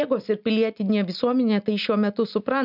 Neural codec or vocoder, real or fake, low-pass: none; real; 5.4 kHz